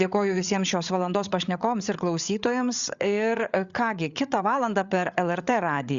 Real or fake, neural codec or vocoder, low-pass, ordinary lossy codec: fake; codec, 16 kHz, 8 kbps, FreqCodec, larger model; 7.2 kHz; Opus, 64 kbps